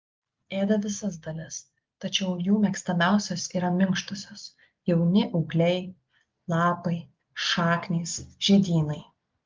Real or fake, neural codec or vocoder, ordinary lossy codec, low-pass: real; none; Opus, 32 kbps; 7.2 kHz